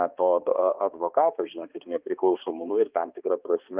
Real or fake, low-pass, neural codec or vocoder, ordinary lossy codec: fake; 3.6 kHz; codec, 16 kHz, 2 kbps, X-Codec, HuBERT features, trained on balanced general audio; Opus, 24 kbps